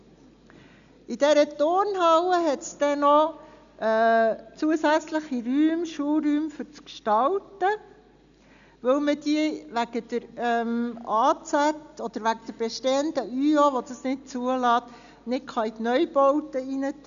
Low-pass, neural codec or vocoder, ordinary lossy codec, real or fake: 7.2 kHz; none; none; real